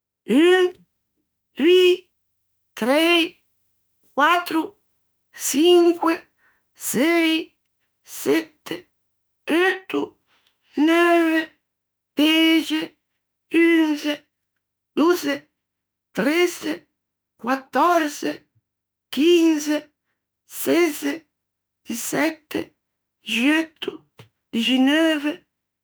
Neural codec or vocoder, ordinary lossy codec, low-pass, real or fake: autoencoder, 48 kHz, 32 numbers a frame, DAC-VAE, trained on Japanese speech; none; none; fake